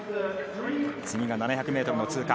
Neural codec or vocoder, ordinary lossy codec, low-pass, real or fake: none; none; none; real